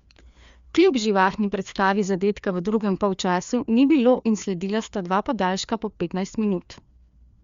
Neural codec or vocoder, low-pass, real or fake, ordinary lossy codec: codec, 16 kHz, 2 kbps, FreqCodec, larger model; 7.2 kHz; fake; Opus, 64 kbps